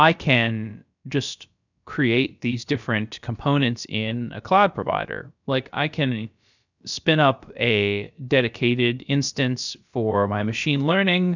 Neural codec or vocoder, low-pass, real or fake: codec, 16 kHz, about 1 kbps, DyCAST, with the encoder's durations; 7.2 kHz; fake